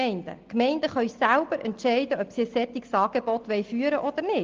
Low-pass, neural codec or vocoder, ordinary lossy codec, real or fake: 7.2 kHz; none; Opus, 24 kbps; real